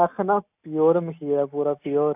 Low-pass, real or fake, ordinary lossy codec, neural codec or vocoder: 3.6 kHz; real; AAC, 32 kbps; none